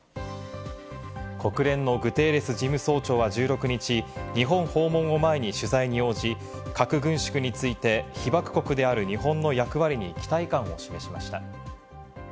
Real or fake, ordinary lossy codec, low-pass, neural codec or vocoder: real; none; none; none